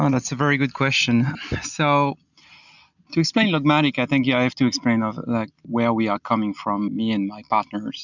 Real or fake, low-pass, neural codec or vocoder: real; 7.2 kHz; none